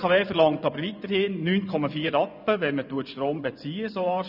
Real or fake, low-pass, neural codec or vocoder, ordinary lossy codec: fake; 5.4 kHz; vocoder, 24 kHz, 100 mel bands, Vocos; none